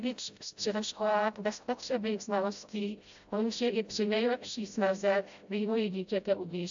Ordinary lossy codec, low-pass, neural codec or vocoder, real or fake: AAC, 64 kbps; 7.2 kHz; codec, 16 kHz, 0.5 kbps, FreqCodec, smaller model; fake